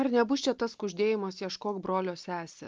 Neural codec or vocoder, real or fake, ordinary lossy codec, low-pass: none; real; Opus, 24 kbps; 7.2 kHz